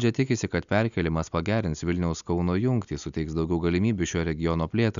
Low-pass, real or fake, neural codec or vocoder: 7.2 kHz; real; none